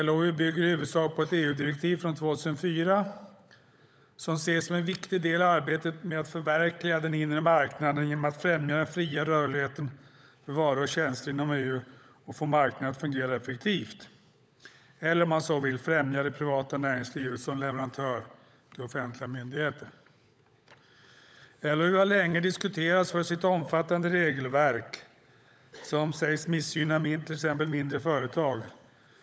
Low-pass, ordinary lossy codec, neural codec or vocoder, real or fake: none; none; codec, 16 kHz, 16 kbps, FunCodec, trained on LibriTTS, 50 frames a second; fake